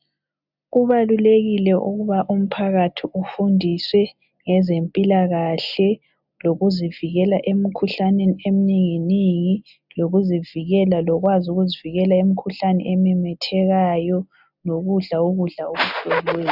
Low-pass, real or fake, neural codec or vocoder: 5.4 kHz; real; none